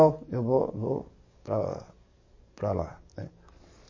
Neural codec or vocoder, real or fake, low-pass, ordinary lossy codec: codec, 44.1 kHz, 7.8 kbps, DAC; fake; 7.2 kHz; MP3, 32 kbps